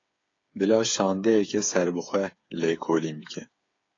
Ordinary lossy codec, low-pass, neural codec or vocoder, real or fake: AAC, 32 kbps; 7.2 kHz; codec, 16 kHz, 8 kbps, FreqCodec, smaller model; fake